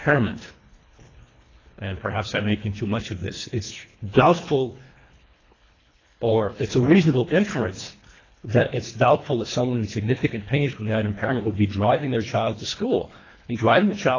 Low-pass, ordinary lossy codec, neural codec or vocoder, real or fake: 7.2 kHz; AAC, 32 kbps; codec, 24 kHz, 1.5 kbps, HILCodec; fake